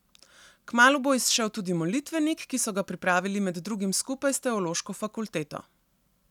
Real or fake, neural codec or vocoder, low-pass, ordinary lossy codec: real; none; 19.8 kHz; none